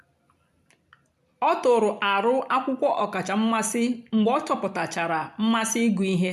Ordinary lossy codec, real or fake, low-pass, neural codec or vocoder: none; real; 14.4 kHz; none